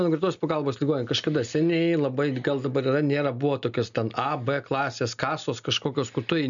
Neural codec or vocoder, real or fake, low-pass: none; real; 7.2 kHz